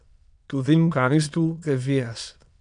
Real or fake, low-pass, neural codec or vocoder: fake; 9.9 kHz; autoencoder, 22.05 kHz, a latent of 192 numbers a frame, VITS, trained on many speakers